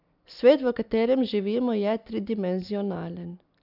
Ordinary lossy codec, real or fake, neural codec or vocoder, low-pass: none; real; none; 5.4 kHz